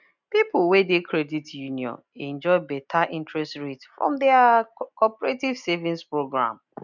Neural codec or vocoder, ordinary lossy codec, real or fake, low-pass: none; none; real; 7.2 kHz